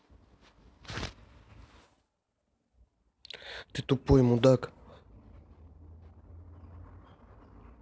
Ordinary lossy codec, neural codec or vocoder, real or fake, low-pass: none; none; real; none